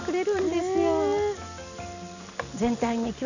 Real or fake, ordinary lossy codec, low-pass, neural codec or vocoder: real; none; 7.2 kHz; none